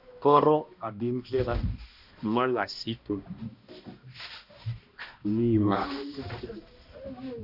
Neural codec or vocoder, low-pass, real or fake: codec, 16 kHz, 1 kbps, X-Codec, HuBERT features, trained on balanced general audio; 5.4 kHz; fake